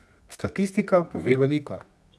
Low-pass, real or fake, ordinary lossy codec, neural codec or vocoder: none; fake; none; codec, 24 kHz, 0.9 kbps, WavTokenizer, medium music audio release